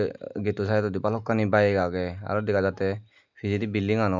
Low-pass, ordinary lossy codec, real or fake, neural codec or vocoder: 7.2 kHz; none; real; none